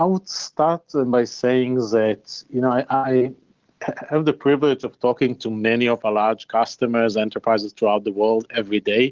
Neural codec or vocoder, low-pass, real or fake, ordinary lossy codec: none; 7.2 kHz; real; Opus, 16 kbps